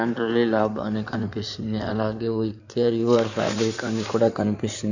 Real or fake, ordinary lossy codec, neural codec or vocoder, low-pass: fake; none; codec, 16 kHz in and 24 kHz out, 2.2 kbps, FireRedTTS-2 codec; 7.2 kHz